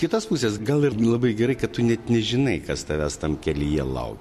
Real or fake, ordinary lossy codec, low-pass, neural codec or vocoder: real; MP3, 64 kbps; 14.4 kHz; none